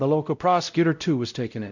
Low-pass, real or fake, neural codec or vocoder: 7.2 kHz; fake; codec, 16 kHz, 0.5 kbps, X-Codec, WavLM features, trained on Multilingual LibriSpeech